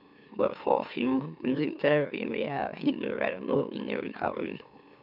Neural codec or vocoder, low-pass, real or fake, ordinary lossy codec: autoencoder, 44.1 kHz, a latent of 192 numbers a frame, MeloTTS; 5.4 kHz; fake; none